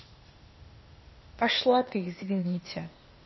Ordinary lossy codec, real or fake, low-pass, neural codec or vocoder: MP3, 24 kbps; fake; 7.2 kHz; codec, 16 kHz, 0.8 kbps, ZipCodec